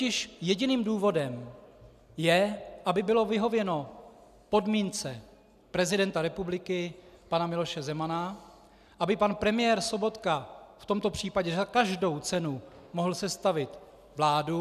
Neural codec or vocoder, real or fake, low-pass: none; real; 14.4 kHz